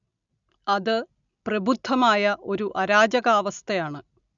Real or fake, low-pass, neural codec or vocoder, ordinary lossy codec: real; 7.2 kHz; none; none